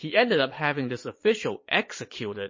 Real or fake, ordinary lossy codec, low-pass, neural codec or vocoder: fake; MP3, 32 kbps; 7.2 kHz; vocoder, 22.05 kHz, 80 mel bands, Vocos